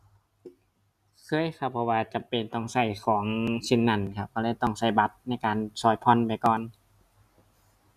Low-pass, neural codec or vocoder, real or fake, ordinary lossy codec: 14.4 kHz; none; real; none